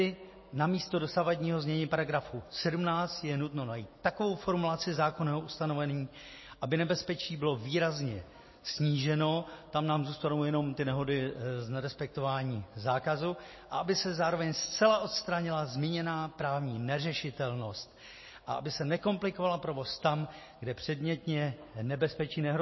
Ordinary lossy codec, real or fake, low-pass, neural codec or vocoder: MP3, 24 kbps; real; 7.2 kHz; none